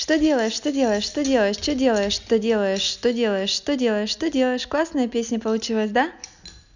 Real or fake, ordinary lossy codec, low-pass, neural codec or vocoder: real; none; 7.2 kHz; none